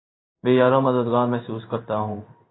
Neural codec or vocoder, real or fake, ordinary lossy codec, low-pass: codec, 16 kHz in and 24 kHz out, 1 kbps, XY-Tokenizer; fake; AAC, 16 kbps; 7.2 kHz